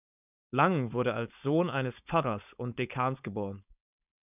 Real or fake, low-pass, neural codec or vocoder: fake; 3.6 kHz; codec, 16 kHz, 4.8 kbps, FACodec